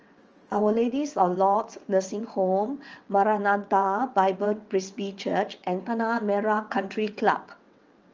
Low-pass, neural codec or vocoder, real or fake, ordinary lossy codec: 7.2 kHz; vocoder, 22.05 kHz, 80 mel bands, WaveNeXt; fake; Opus, 24 kbps